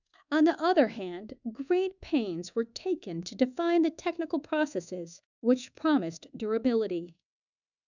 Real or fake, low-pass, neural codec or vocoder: fake; 7.2 kHz; codec, 24 kHz, 1.2 kbps, DualCodec